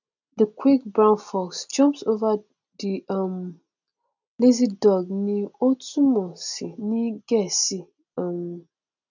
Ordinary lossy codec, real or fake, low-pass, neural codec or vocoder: none; real; 7.2 kHz; none